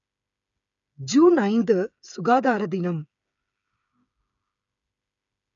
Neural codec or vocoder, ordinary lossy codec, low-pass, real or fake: codec, 16 kHz, 8 kbps, FreqCodec, smaller model; none; 7.2 kHz; fake